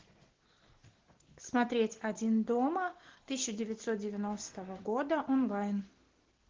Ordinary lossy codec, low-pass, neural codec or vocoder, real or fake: Opus, 16 kbps; 7.2 kHz; none; real